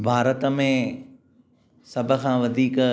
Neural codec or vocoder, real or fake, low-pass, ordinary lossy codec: none; real; none; none